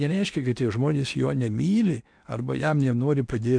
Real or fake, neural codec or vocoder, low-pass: fake; codec, 16 kHz in and 24 kHz out, 0.8 kbps, FocalCodec, streaming, 65536 codes; 9.9 kHz